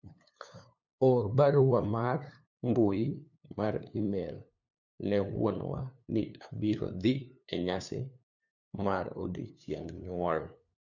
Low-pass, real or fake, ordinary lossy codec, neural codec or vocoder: 7.2 kHz; fake; none; codec, 16 kHz, 2 kbps, FunCodec, trained on LibriTTS, 25 frames a second